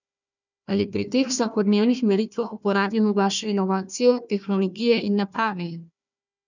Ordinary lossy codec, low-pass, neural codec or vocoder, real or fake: none; 7.2 kHz; codec, 16 kHz, 1 kbps, FunCodec, trained on Chinese and English, 50 frames a second; fake